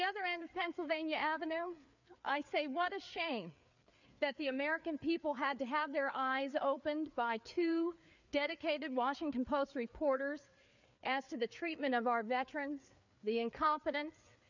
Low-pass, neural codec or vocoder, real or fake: 7.2 kHz; codec, 16 kHz, 4 kbps, FreqCodec, larger model; fake